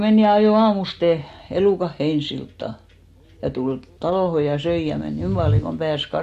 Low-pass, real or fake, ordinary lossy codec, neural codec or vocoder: 14.4 kHz; real; MP3, 64 kbps; none